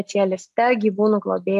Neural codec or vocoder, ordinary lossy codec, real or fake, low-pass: none; MP3, 64 kbps; real; 14.4 kHz